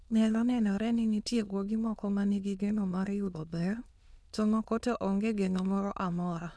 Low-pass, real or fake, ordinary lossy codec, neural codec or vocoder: none; fake; none; autoencoder, 22.05 kHz, a latent of 192 numbers a frame, VITS, trained on many speakers